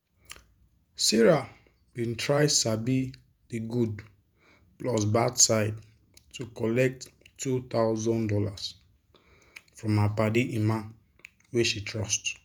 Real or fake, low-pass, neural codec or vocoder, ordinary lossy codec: fake; none; vocoder, 48 kHz, 128 mel bands, Vocos; none